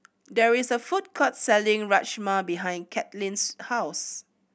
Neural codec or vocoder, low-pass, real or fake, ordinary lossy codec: none; none; real; none